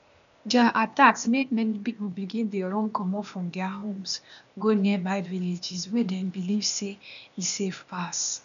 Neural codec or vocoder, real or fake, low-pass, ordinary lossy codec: codec, 16 kHz, 0.8 kbps, ZipCodec; fake; 7.2 kHz; none